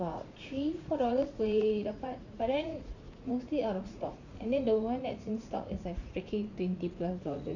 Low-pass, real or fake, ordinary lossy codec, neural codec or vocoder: 7.2 kHz; fake; none; vocoder, 44.1 kHz, 80 mel bands, Vocos